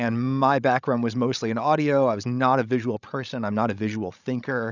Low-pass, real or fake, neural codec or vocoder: 7.2 kHz; fake; codec, 16 kHz, 16 kbps, FreqCodec, larger model